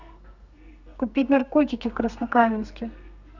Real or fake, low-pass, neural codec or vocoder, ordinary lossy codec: fake; 7.2 kHz; codec, 32 kHz, 1.9 kbps, SNAC; none